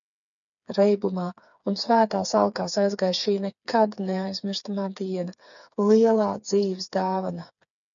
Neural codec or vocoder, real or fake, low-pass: codec, 16 kHz, 4 kbps, FreqCodec, smaller model; fake; 7.2 kHz